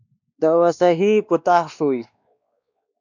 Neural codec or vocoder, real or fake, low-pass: codec, 16 kHz, 2 kbps, X-Codec, WavLM features, trained on Multilingual LibriSpeech; fake; 7.2 kHz